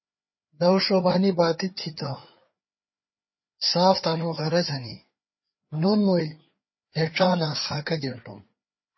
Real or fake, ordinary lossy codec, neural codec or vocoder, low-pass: fake; MP3, 24 kbps; codec, 16 kHz, 4 kbps, FreqCodec, larger model; 7.2 kHz